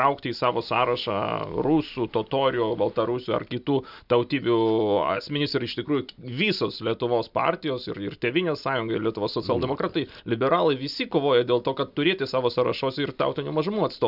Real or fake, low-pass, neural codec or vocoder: real; 5.4 kHz; none